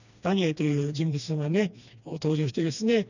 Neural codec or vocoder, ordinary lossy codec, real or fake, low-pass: codec, 16 kHz, 2 kbps, FreqCodec, smaller model; none; fake; 7.2 kHz